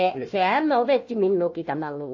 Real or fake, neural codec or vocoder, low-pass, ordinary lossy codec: fake; codec, 16 kHz, 1 kbps, FunCodec, trained on Chinese and English, 50 frames a second; 7.2 kHz; MP3, 32 kbps